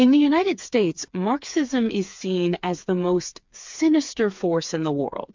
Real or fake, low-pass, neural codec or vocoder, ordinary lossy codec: fake; 7.2 kHz; codec, 16 kHz, 4 kbps, FreqCodec, smaller model; MP3, 64 kbps